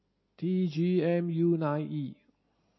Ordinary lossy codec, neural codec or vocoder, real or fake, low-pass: MP3, 24 kbps; none; real; 7.2 kHz